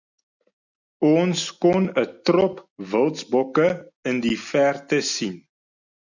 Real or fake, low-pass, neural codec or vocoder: real; 7.2 kHz; none